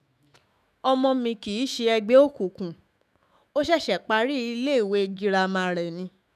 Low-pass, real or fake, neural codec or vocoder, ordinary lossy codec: 14.4 kHz; fake; autoencoder, 48 kHz, 128 numbers a frame, DAC-VAE, trained on Japanese speech; none